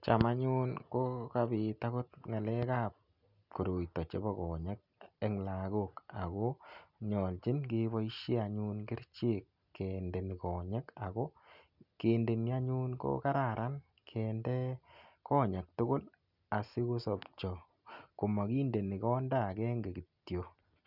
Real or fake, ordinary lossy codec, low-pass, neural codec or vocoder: real; none; 5.4 kHz; none